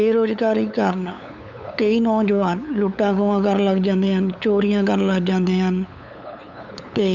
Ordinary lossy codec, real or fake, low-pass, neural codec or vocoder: none; fake; 7.2 kHz; codec, 16 kHz, 8 kbps, FunCodec, trained on LibriTTS, 25 frames a second